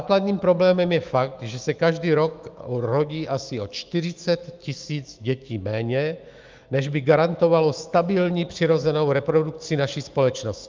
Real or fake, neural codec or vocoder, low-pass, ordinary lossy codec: fake; autoencoder, 48 kHz, 128 numbers a frame, DAC-VAE, trained on Japanese speech; 7.2 kHz; Opus, 24 kbps